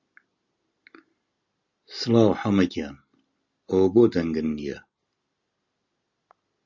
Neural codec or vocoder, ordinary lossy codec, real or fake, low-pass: none; Opus, 64 kbps; real; 7.2 kHz